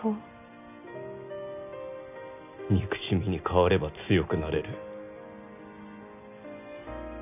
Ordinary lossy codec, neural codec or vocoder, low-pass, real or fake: none; none; 3.6 kHz; real